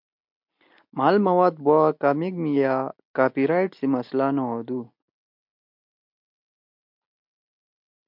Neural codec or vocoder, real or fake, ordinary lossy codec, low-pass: none; real; MP3, 48 kbps; 5.4 kHz